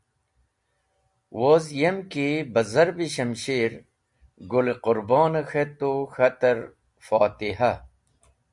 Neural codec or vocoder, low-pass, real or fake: none; 10.8 kHz; real